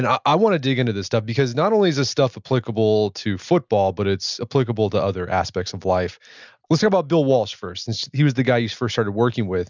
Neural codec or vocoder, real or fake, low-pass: none; real; 7.2 kHz